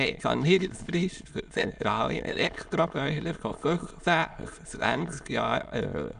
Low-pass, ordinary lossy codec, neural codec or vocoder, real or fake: 9.9 kHz; none; autoencoder, 22.05 kHz, a latent of 192 numbers a frame, VITS, trained on many speakers; fake